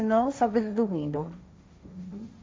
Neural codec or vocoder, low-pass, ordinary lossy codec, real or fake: codec, 16 kHz, 1.1 kbps, Voila-Tokenizer; none; none; fake